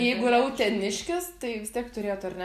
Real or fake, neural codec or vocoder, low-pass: real; none; 14.4 kHz